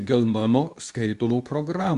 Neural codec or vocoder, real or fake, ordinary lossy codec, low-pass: codec, 24 kHz, 0.9 kbps, WavTokenizer, medium speech release version 2; fake; Opus, 64 kbps; 10.8 kHz